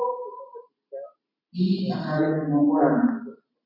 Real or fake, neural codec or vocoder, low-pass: real; none; 5.4 kHz